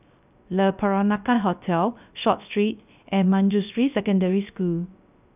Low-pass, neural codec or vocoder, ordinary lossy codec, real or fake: 3.6 kHz; codec, 16 kHz, 0.3 kbps, FocalCodec; none; fake